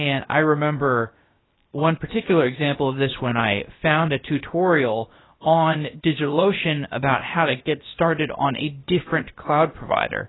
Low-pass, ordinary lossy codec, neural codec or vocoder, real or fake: 7.2 kHz; AAC, 16 kbps; codec, 16 kHz, about 1 kbps, DyCAST, with the encoder's durations; fake